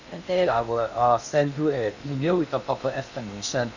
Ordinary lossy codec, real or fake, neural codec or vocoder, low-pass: none; fake; codec, 16 kHz in and 24 kHz out, 0.8 kbps, FocalCodec, streaming, 65536 codes; 7.2 kHz